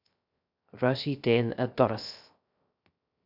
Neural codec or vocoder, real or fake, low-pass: codec, 16 kHz, 0.3 kbps, FocalCodec; fake; 5.4 kHz